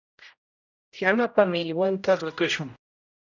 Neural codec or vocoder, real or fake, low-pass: codec, 16 kHz, 0.5 kbps, X-Codec, HuBERT features, trained on general audio; fake; 7.2 kHz